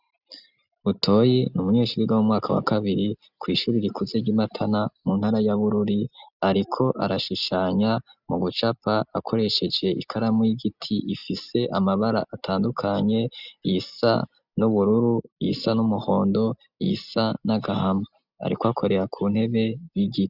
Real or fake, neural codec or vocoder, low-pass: real; none; 5.4 kHz